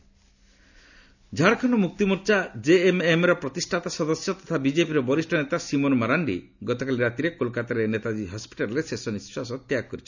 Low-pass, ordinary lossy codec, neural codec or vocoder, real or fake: 7.2 kHz; none; none; real